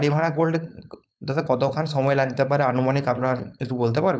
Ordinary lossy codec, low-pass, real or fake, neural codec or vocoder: none; none; fake; codec, 16 kHz, 4.8 kbps, FACodec